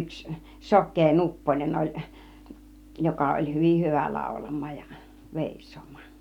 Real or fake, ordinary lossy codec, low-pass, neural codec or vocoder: real; none; 19.8 kHz; none